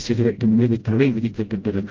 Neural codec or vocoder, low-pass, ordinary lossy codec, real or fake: codec, 16 kHz, 0.5 kbps, FreqCodec, smaller model; 7.2 kHz; Opus, 32 kbps; fake